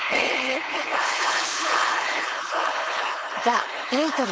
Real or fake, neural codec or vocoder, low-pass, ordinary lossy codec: fake; codec, 16 kHz, 4.8 kbps, FACodec; none; none